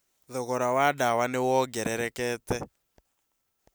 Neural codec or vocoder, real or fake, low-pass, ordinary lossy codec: none; real; none; none